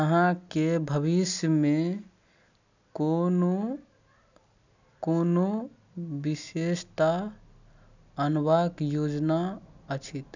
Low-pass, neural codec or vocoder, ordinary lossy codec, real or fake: 7.2 kHz; none; none; real